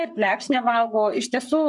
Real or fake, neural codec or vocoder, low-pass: fake; codec, 44.1 kHz, 7.8 kbps, Pupu-Codec; 10.8 kHz